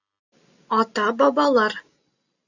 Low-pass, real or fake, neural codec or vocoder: 7.2 kHz; real; none